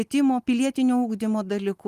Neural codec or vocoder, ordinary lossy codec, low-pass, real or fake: none; Opus, 32 kbps; 14.4 kHz; real